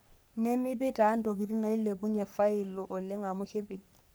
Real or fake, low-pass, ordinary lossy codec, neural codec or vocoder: fake; none; none; codec, 44.1 kHz, 3.4 kbps, Pupu-Codec